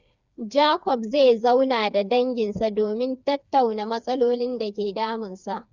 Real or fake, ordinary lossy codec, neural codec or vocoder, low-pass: fake; Opus, 64 kbps; codec, 16 kHz, 4 kbps, FreqCodec, smaller model; 7.2 kHz